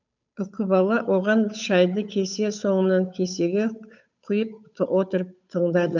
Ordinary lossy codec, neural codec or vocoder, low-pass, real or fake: none; codec, 16 kHz, 8 kbps, FunCodec, trained on Chinese and English, 25 frames a second; 7.2 kHz; fake